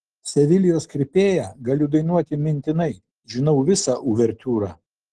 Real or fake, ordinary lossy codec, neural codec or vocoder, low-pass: real; Opus, 16 kbps; none; 10.8 kHz